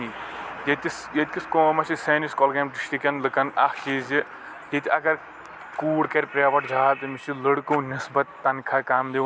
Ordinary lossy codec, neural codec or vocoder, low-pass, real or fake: none; none; none; real